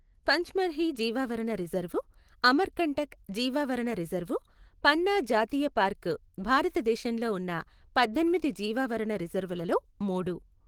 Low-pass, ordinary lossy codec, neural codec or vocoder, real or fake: 19.8 kHz; Opus, 16 kbps; autoencoder, 48 kHz, 128 numbers a frame, DAC-VAE, trained on Japanese speech; fake